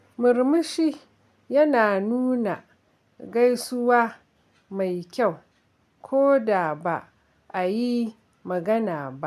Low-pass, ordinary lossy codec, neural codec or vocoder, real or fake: 14.4 kHz; none; none; real